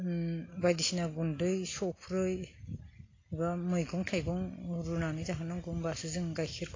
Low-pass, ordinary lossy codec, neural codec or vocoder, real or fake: 7.2 kHz; AAC, 32 kbps; none; real